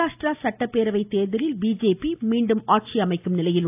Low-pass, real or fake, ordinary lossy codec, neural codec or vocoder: 3.6 kHz; real; AAC, 32 kbps; none